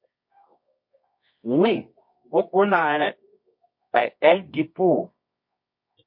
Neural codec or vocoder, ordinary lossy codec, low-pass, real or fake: codec, 24 kHz, 0.9 kbps, WavTokenizer, medium music audio release; MP3, 32 kbps; 5.4 kHz; fake